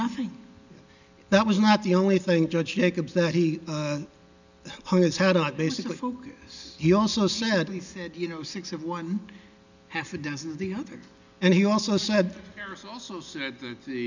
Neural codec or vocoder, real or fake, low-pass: none; real; 7.2 kHz